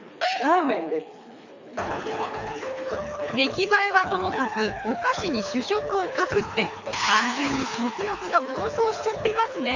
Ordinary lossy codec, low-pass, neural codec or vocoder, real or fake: AAC, 48 kbps; 7.2 kHz; codec, 24 kHz, 3 kbps, HILCodec; fake